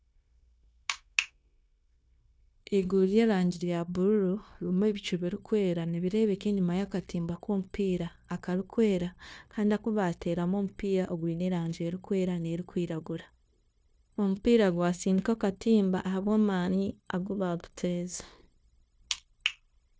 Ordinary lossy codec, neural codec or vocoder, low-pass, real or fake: none; codec, 16 kHz, 0.9 kbps, LongCat-Audio-Codec; none; fake